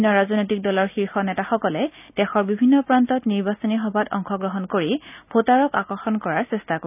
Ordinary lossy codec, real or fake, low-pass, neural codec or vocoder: none; real; 3.6 kHz; none